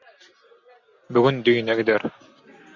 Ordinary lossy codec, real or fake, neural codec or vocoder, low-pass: Opus, 64 kbps; real; none; 7.2 kHz